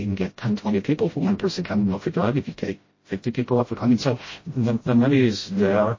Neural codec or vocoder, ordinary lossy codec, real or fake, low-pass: codec, 16 kHz, 0.5 kbps, FreqCodec, smaller model; MP3, 32 kbps; fake; 7.2 kHz